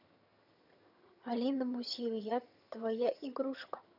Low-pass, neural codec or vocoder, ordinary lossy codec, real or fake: 5.4 kHz; vocoder, 22.05 kHz, 80 mel bands, HiFi-GAN; none; fake